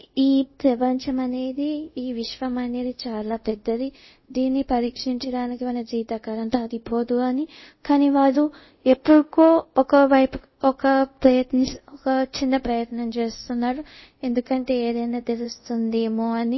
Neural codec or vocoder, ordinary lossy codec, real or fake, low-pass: codec, 24 kHz, 0.5 kbps, DualCodec; MP3, 24 kbps; fake; 7.2 kHz